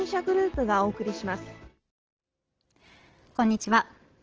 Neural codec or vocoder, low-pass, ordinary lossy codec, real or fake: none; 7.2 kHz; Opus, 16 kbps; real